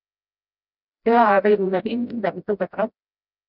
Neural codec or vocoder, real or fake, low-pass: codec, 16 kHz, 0.5 kbps, FreqCodec, smaller model; fake; 5.4 kHz